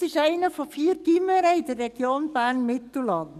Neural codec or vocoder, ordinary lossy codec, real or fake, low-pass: codec, 44.1 kHz, 7.8 kbps, DAC; none; fake; 14.4 kHz